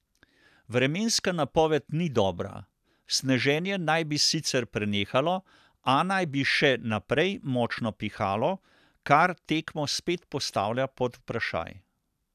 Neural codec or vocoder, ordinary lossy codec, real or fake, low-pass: none; none; real; 14.4 kHz